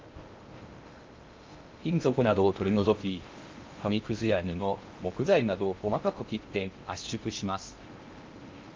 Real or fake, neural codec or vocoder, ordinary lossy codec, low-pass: fake; codec, 16 kHz in and 24 kHz out, 0.6 kbps, FocalCodec, streaming, 2048 codes; Opus, 24 kbps; 7.2 kHz